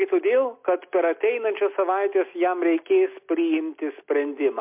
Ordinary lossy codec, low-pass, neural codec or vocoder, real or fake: MP3, 32 kbps; 3.6 kHz; none; real